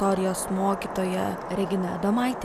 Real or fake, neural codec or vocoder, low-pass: real; none; 14.4 kHz